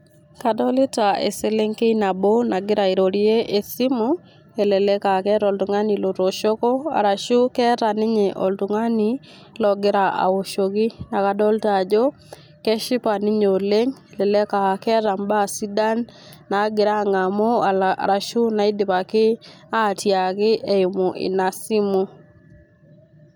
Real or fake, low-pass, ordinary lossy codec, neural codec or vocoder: real; none; none; none